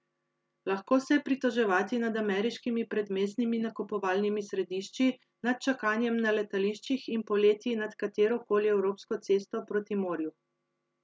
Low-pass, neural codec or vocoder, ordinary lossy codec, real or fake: none; none; none; real